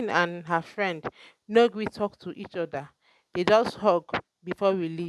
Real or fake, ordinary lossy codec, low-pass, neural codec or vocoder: real; none; none; none